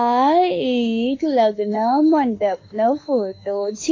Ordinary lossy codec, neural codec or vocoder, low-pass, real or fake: AAC, 32 kbps; autoencoder, 48 kHz, 32 numbers a frame, DAC-VAE, trained on Japanese speech; 7.2 kHz; fake